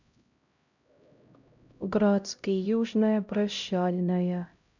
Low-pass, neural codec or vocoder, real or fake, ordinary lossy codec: 7.2 kHz; codec, 16 kHz, 0.5 kbps, X-Codec, HuBERT features, trained on LibriSpeech; fake; none